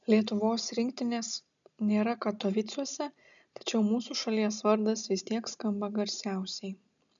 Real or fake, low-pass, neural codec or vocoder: real; 7.2 kHz; none